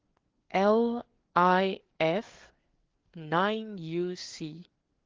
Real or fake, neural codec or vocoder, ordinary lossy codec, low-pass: fake; codec, 16 kHz, 4 kbps, FreqCodec, larger model; Opus, 16 kbps; 7.2 kHz